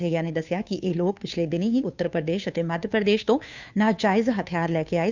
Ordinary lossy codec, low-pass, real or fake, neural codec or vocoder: none; 7.2 kHz; fake; codec, 16 kHz, 2 kbps, FunCodec, trained on Chinese and English, 25 frames a second